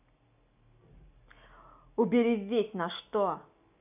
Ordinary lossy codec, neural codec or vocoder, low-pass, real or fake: none; none; 3.6 kHz; real